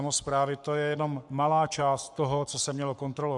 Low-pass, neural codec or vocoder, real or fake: 10.8 kHz; codec, 44.1 kHz, 7.8 kbps, Pupu-Codec; fake